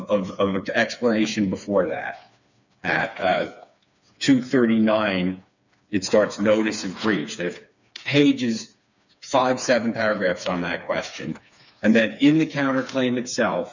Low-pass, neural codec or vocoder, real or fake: 7.2 kHz; codec, 16 kHz, 4 kbps, FreqCodec, smaller model; fake